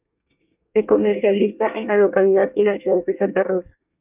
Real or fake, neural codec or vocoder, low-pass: fake; codec, 24 kHz, 1 kbps, SNAC; 3.6 kHz